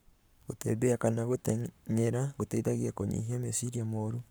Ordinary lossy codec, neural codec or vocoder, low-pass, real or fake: none; codec, 44.1 kHz, 7.8 kbps, Pupu-Codec; none; fake